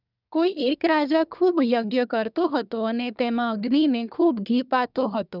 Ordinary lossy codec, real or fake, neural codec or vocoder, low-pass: none; fake; codec, 24 kHz, 1 kbps, SNAC; 5.4 kHz